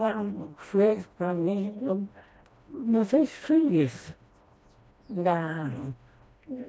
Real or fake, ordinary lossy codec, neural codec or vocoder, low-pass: fake; none; codec, 16 kHz, 1 kbps, FreqCodec, smaller model; none